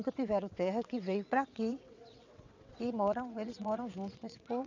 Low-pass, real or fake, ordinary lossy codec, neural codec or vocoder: 7.2 kHz; fake; none; vocoder, 22.05 kHz, 80 mel bands, WaveNeXt